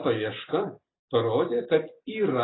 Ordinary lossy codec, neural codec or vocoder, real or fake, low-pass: AAC, 16 kbps; none; real; 7.2 kHz